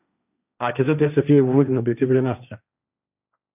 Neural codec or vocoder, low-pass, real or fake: codec, 16 kHz, 1.1 kbps, Voila-Tokenizer; 3.6 kHz; fake